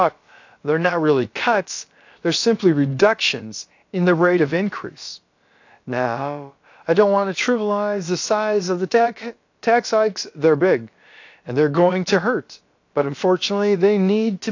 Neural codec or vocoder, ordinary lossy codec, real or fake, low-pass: codec, 16 kHz, about 1 kbps, DyCAST, with the encoder's durations; AAC, 48 kbps; fake; 7.2 kHz